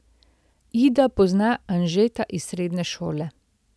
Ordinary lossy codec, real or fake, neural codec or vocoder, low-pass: none; real; none; none